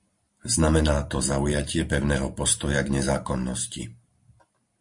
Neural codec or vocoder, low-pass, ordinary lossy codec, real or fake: vocoder, 44.1 kHz, 128 mel bands every 512 samples, BigVGAN v2; 10.8 kHz; MP3, 48 kbps; fake